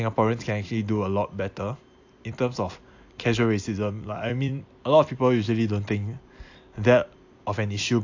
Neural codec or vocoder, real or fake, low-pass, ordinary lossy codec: vocoder, 44.1 kHz, 128 mel bands every 256 samples, BigVGAN v2; fake; 7.2 kHz; AAC, 48 kbps